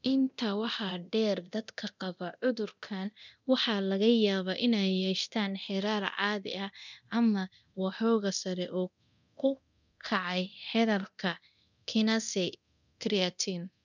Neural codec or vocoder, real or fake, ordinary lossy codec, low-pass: codec, 24 kHz, 0.9 kbps, DualCodec; fake; none; 7.2 kHz